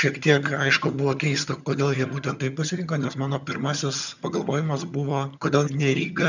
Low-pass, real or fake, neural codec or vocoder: 7.2 kHz; fake; vocoder, 22.05 kHz, 80 mel bands, HiFi-GAN